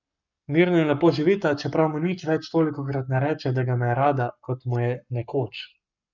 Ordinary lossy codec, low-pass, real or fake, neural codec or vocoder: none; 7.2 kHz; fake; codec, 44.1 kHz, 7.8 kbps, Pupu-Codec